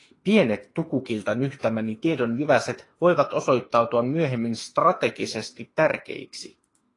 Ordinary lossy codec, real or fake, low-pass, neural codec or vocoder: AAC, 32 kbps; fake; 10.8 kHz; autoencoder, 48 kHz, 32 numbers a frame, DAC-VAE, trained on Japanese speech